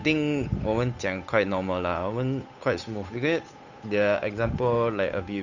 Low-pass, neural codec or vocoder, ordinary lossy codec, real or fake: 7.2 kHz; codec, 16 kHz, 8 kbps, FunCodec, trained on Chinese and English, 25 frames a second; none; fake